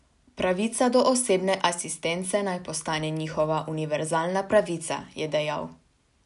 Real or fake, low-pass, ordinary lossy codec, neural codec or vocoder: real; 10.8 kHz; none; none